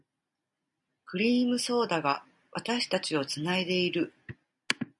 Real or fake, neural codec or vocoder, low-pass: real; none; 10.8 kHz